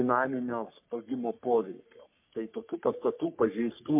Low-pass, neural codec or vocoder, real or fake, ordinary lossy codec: 3.6 kHz; codec, 44.1 kHz, 3.4 kbps, Pupu-Codec; fake; MP3, 32 kbps